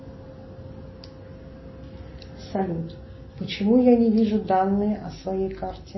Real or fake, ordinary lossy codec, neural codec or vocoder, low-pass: real; MP3, 24 kbps; none; 7.2 kHz